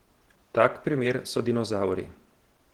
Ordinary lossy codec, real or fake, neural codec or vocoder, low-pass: Opus, 16 kbps; fake; vocoder, 48 kHz, 128 mel bands, Vocos; 19.8 kHz